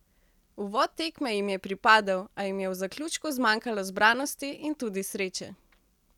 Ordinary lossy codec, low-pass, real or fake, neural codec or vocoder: none; 19.8 kHz; real; none